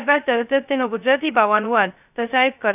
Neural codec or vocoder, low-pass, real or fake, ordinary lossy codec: codec, 16 kHz, 0.2 kbps, FocalCodec; 3.6 kHz; fake; none